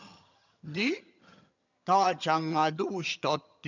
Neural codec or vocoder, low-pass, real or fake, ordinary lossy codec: vocoder, 22.05 kHz, 80 mel bands, HiFi-GAN; 7.2 kHz; fake; none